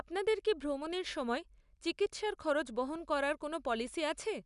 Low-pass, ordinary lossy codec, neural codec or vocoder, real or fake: 10.8 kHz; none; none; real